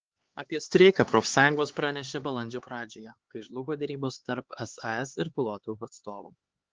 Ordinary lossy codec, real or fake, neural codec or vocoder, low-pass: Opus, 16 kbps; fake; codec, 16 kHz, 4 kbps, X-Codec, HuBERT features, trained on LibriSpeech; 7.2 kHz